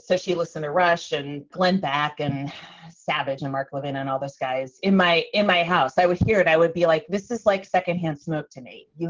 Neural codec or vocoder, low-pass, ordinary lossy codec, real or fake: none; 7.2 kHz; Opus, 16 kbps; real